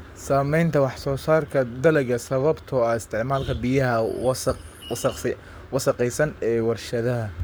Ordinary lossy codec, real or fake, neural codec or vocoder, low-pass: none; fake; codec, 44.1 kHz, 7.8 kbps, Pupu-Codec; none